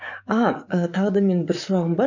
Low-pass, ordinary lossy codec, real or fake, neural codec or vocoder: 7.2 kHz; none; fake; codec, 44.1 kHz, 7.8 kbps, DAC